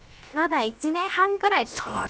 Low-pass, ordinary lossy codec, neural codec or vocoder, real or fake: none; none; codec, 16 kHz, about 1 kbps, DyCAST, with the encoder's durations; fake